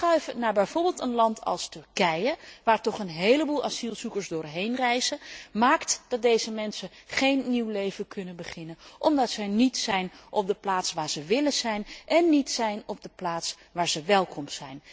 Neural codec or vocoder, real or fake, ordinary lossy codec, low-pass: none; real; none; none